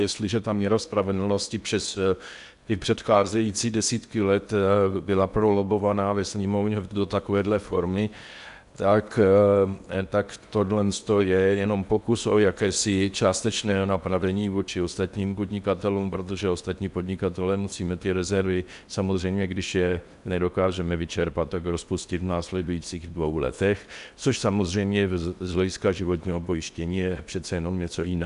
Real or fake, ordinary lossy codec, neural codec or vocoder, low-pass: fake; MP3, 96 kbps; codec, 16 kHz in and 24 kHz out, 0.8 kbps, FocalCodec, streaming, 65536 codes; 10.8 kHz